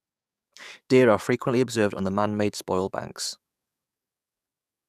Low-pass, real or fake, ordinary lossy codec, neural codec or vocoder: 14.4 kHz; fake; none; codec, 44.1 kHz, 7.8 kbps, DAC